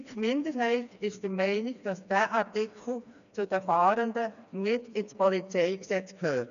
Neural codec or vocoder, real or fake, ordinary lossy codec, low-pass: codec, 16 kHz, 2 kbps, FreqCodec, smaller model; fake; none; 7.2 kHz